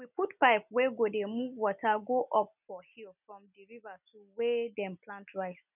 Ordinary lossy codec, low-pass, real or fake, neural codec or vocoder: none; 3.6 kHz; real; none